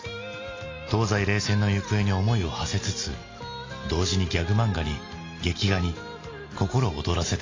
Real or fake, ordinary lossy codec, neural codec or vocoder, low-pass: real; AAC, 32 kbps; none; 7.2 kHz